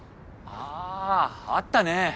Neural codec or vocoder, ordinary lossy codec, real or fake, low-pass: none; none; real; none